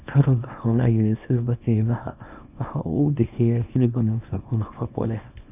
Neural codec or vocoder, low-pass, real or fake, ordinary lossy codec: codec, 24 kHz, 0.9 kbps, WavTokenizer, small release; 3.6 kHz; fake; none